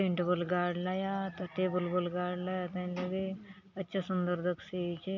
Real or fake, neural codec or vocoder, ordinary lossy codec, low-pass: real; none; none; 7.2 kHz